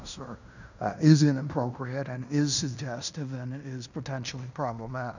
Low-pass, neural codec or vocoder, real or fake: 7.2 kHz; codec, 16 kHz in and 24 kHz out, 0.9 kbps, LongCat-Audio-Codec, fine tuned four codebook decoder; fake